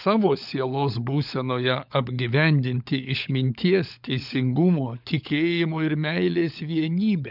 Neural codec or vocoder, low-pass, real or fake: codec, 16 kHz, 16 kbps, FunCodec, trained on LibriTTS, 50 frames a second; 5.4 kHz; fake